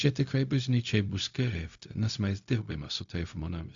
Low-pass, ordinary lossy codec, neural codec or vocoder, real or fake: 7.2 kHz; AAC, 64 kbps; codec, 16 kHz, 0.4 kbps, LongCat-Audio-Codec; fake